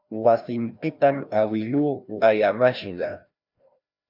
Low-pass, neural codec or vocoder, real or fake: 5.4 kHz; codec, 16 kHz, 1 kbps, FreqCodec, larger model; fake